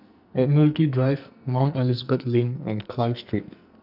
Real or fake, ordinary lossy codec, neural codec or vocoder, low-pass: fake; none; codec, 44.1 kHz, 2.6 kbps, SNAC; 5.4 kHz